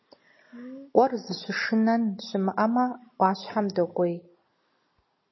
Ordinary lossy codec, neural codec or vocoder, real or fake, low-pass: MP3, 24 kbps; none; real; 7.2 kHz